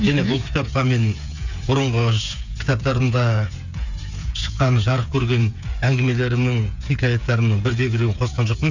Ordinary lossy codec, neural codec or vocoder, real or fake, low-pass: none; codec, 16 kHz, 8 kbps, FreqCodec, smaller model; fake; 7.2 kHz